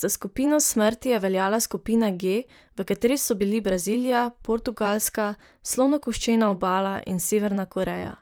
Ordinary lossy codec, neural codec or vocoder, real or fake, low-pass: none; vocoder, 44.1 kHz, 128 mel bands, Pupu-Vocoder; fake; none